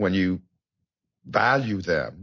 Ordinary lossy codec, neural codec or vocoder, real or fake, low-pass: MP3, 32 kbps; none; real; 7.2 kHz